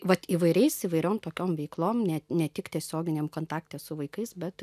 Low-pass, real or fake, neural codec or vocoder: 14.4 kHz; real; none